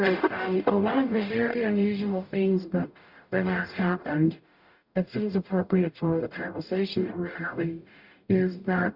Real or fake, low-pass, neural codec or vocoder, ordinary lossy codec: fake; 5.4 kHz; codec, 44.1 kHz, 0.9 kbps, DAC; Opus, 64 kbps